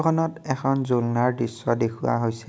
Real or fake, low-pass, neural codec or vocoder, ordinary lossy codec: real; none; none; none